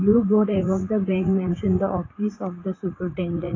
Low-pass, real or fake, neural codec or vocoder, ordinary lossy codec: 7.2 kHz; fake; vocoder, 44.1 kHz, 80 mel bands, Vocos; AAC, 32 kbps